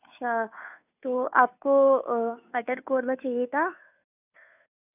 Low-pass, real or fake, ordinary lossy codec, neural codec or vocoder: 3.6 kHz; fake; none; codec, 16 kHz, 2 kbps, FunCodec, trained on Chinese and English, 25 frames a second